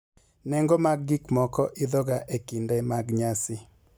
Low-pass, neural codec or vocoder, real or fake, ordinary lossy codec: none; none; real; none